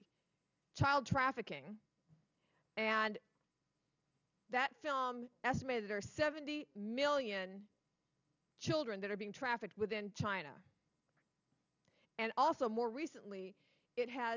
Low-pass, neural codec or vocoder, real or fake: 7.2 kHz; none; real